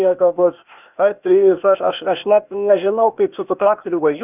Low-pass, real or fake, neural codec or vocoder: 3.6 kHz; fake; codec, 16 kHz, 0.8 kbps, ZipCodec